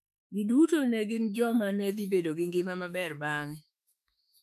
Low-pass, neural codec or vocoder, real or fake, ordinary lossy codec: 14.4 kHz; autoencoder, 48 kHz, 32 numbers a frame, DAC-VAE, trained on Japanese speech; fake; none